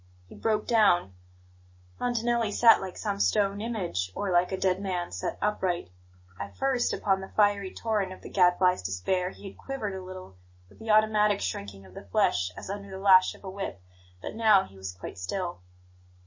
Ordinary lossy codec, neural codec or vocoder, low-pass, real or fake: MP3, 32 kbps; none; 7.2 kHz; real